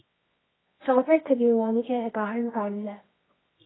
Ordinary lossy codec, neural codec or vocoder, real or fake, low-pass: AAC, 16 kbps; codec, 24 kHz, 0.9 kbps, WavTokenizer, medium music audio release; fake; 7.2 kHz